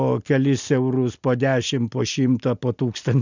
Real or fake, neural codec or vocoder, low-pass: real; none; 7.2 kHz